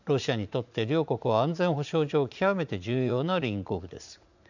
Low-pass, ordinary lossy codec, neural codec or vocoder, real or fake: 7.2 kHz; none; vocoder, 44.1 kHz, 80 mel bands, Vocos; fake